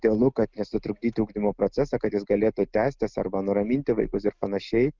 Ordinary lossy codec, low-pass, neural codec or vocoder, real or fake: Opus, 32 kbps; 7.2 kHz; none; real